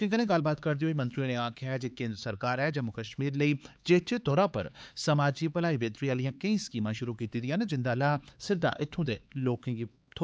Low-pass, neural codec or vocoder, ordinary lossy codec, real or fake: none; codec, 16 kHz, 4 kbps, X-Codec, HuBERT features, trained on LibriSpeech; none; fake